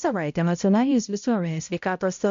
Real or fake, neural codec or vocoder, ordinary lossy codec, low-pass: fake; codec, 16 kHz, 0.5 kbps, X-Codec, HuBERT features, trained on balanced general audio; MP3, 64 kbps; 7.2 kHz